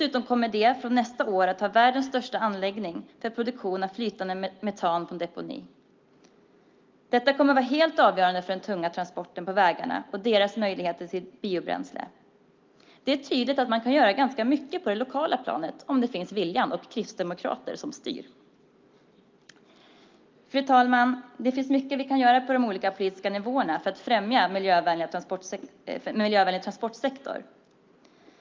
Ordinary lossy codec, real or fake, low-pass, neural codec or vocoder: Opus, 24 kbps; real; 7.2 kHz; none